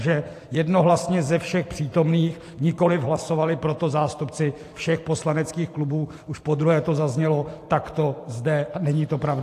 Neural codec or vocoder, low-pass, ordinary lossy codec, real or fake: vocoder, 44.1 kHz, 128 mel bands every 512 samples, BigVGAN v2; 14.4 kHz; AAC, 64 kbps; fake